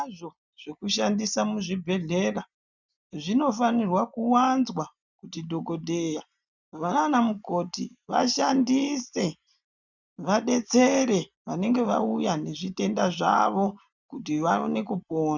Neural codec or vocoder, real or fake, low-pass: none; real; 7.2 kHz